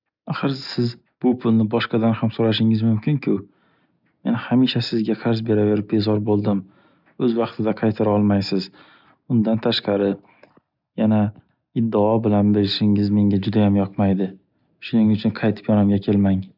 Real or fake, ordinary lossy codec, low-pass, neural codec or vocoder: real; none; 5.4 kHz; none